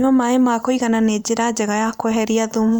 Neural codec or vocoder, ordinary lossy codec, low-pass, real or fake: vocoder, 44.1 kHz, 128 mel bands every 512 samples, BigVGAN v2; none; none; fake